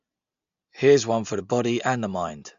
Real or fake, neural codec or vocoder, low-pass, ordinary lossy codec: real; none; 7.2 kHz; AAC, 64 kbps